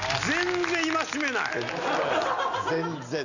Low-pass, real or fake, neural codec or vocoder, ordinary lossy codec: 7.2 kHz; real; none; none